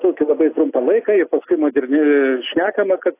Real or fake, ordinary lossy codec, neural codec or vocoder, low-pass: real; AAC, 24 kbps; none; 3.6 kHz